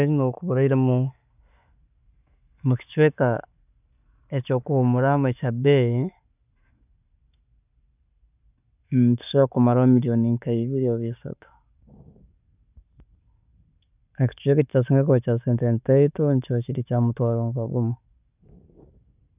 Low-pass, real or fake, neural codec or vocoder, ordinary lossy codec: 3.6 kHz; real; none; none